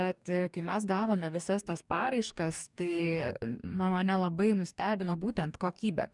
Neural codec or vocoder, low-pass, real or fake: codec, 44.1 kHz, 2.6 kbps, DAC; 10.8 kHz; fake